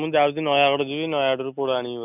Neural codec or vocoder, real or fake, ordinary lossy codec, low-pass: none; real; none; 3.6 kHz